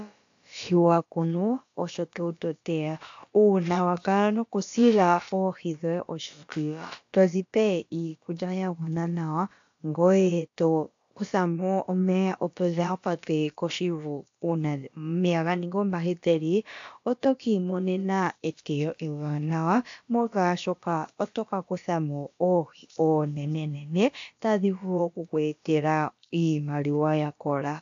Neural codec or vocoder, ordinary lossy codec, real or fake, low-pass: codec, 16 kHz, about 1 kbps, DyCAST, with the encoder's durations; AAC, 64 kbps; fake; 7.2 kHz